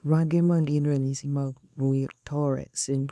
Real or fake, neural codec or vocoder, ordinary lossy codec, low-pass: fake; codec, 24 kHz, 0.9 kbps, WavTokenizer, small release; none; none